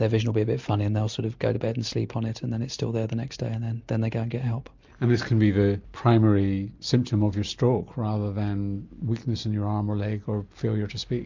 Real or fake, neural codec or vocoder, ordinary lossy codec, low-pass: real; none; MP3, 64 kbps; 7.2 kHz